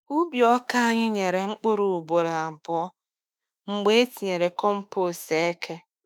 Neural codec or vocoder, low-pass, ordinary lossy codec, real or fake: autoencoder, 48 kHz, 32 numbers a frame, DAC-VAE, trained on Japanese speech; none; none; fake